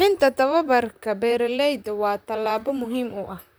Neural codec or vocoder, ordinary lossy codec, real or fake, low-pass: vocoder, 44.1 kHz, 128 mel bands, Pupu-Vocoder; none; fake; none